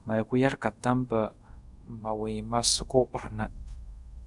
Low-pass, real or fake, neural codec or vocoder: 10.8 kHz; fake; codec, 24 kHz, 0.5 kbps, DualCodec